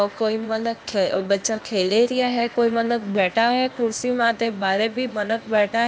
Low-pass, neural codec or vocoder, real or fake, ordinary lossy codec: none; codec, 16 kHz, 0.8 kbps, ZipCodec; fake; none